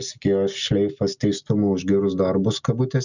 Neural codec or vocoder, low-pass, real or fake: none; 7.2 kHz; real